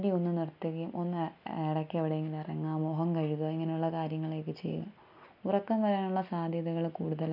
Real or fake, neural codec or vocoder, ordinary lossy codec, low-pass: real; none; none; 5.4 kHz